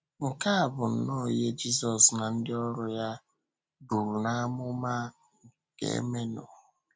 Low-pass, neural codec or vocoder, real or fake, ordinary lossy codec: none; none; real; none